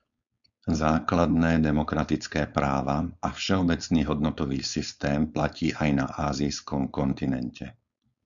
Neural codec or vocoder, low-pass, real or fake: codec, 16 kHz, 4.8 kbps, FACodec; 7.2 kHz; fake